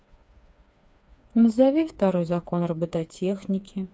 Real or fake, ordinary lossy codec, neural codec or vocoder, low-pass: fake; none; codec, 16 kHz, 4 kbps, FreqCodec, smaller model; none